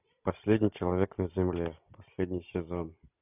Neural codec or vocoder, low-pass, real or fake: none; 3.6 kHz; real